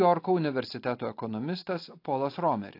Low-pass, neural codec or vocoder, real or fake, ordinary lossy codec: 5.4 kHz; none; real; AAC, 32 kbps